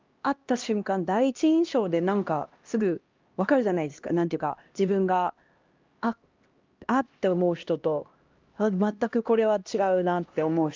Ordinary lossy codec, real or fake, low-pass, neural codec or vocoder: Opus, 24 kbps; fake; 7.2 kHz; codec, 16 kHz, 1 kbps, X-Codec, HuBERT features, trained on LibriSpeech